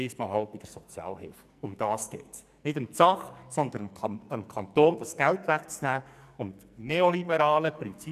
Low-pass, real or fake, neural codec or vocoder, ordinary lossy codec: 14.4 kHz; fake; codec, 44.1 kHz, 2.6 kbps, SNAC; none